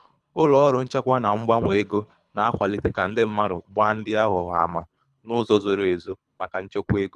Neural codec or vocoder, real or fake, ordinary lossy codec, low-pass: codec, 24 kHz, 3 kbps, HILCodec; fake; none; none